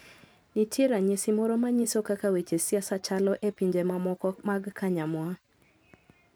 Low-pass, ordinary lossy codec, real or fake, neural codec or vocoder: none; none; fake; vocoder, 44.1 kHz, 128 mel bands every 512 samples, BigVGAN v2